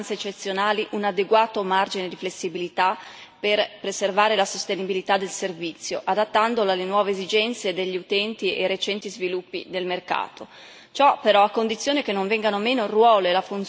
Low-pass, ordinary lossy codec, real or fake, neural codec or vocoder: none; none; real; none